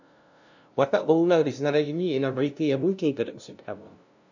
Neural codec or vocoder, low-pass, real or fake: codec, 16 kHz, 0.5 kbps, FunCodec, trained on LibriTTS, 25 frames a second; 7.2 kHz; fake